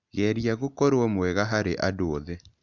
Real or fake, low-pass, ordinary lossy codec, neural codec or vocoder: real; 7.2 kHz; none; none